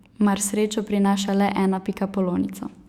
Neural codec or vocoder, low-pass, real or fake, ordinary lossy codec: autoencoder, 48 kHz, 128 numbers a frame, DAC-VAE, trained on Japanese speech; 19.8 kHz; fake; Opus, 64 kbps